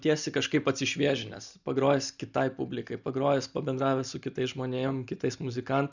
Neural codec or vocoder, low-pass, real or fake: none; 7.2 kHz; real